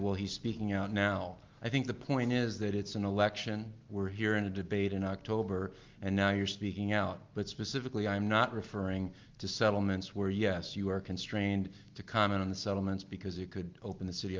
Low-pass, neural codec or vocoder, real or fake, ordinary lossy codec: 7.2 kHz; none; real; Opus, 32 kbps